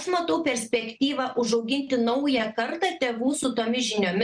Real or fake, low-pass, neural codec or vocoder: real; 9.9 kHz; none